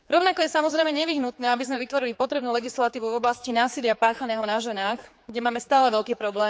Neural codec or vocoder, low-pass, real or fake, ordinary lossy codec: codec, 16 kHz, 4 kbps, X-Codec, HuBERT features, trained on general audio; none; fake; none